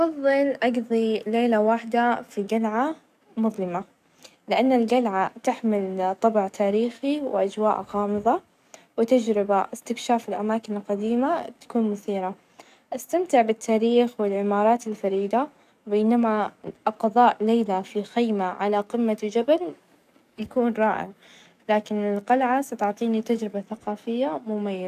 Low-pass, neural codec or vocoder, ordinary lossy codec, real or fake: 14.4 kHz; codec, 44.1 kHz, 7.8 kbps, DAC; none; fake